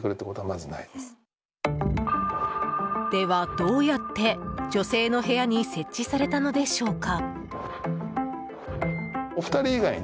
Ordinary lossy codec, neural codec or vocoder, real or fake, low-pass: none; none; real; none